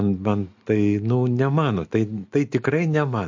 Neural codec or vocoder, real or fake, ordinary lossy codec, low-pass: none; real; MP3, 48 kbps; 7.2 kHz